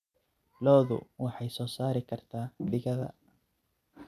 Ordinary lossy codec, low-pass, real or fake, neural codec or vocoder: none; 14.4 kHz; real; none